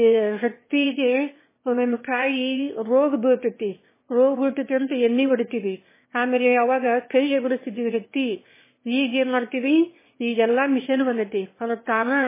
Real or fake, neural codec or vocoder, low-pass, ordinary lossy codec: fake; autoencoder, 22.05 kHz, a latent of 192 numbers a frame, VITS, trained on one speaker; 3.6 kHz; MP3, 16 kbps